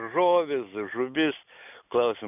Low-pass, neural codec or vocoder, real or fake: 3.6 kHz; none; real